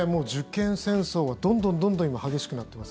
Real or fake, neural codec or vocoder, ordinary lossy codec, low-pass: real; none; none; none